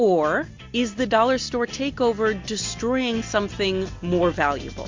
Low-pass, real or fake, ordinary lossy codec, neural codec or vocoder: 7.2 kHz; real; MP3, 48 kbps; none